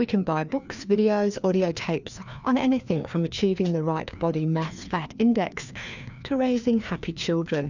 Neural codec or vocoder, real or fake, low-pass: codec, 16 kHz, 2 kbps, FreqCodec, larger model; fake; 7.2 kHz